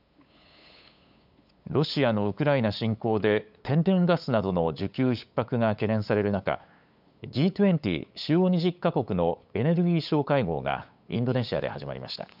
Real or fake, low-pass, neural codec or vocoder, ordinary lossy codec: fake; 5.4 kHz; codec, 16 kHz, 8 kbps, FunCodec, trained on LibriTTS, 25 frames a second; none